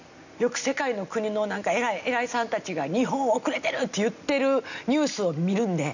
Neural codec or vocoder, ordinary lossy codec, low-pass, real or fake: none; none; 7.2 kHz; real